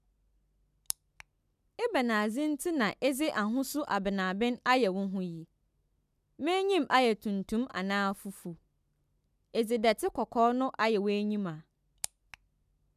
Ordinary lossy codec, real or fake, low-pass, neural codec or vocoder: none; real; 14.4 kHz; none